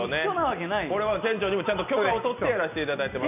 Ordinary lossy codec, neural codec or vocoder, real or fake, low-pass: none; none; real; 3.6 kHz